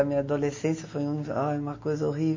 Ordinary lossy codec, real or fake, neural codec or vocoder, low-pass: MP3, 32 kbps; real; none; 7.2 kHz